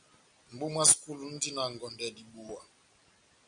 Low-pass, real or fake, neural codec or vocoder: 9.9 kHz; real; none